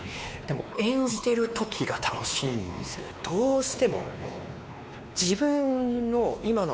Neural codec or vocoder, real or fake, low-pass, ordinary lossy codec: codec, 16 kHz, 2 kbps, X-Codec, WavLM features, trained on Multilingual LibriSpeech; fake; none; none